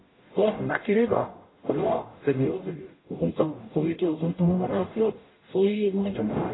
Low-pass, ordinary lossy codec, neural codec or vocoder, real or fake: 7.2 kHz; AAC, 16 kbps; codec, 44.1 kHz, 0.9 kbps, DAC; fake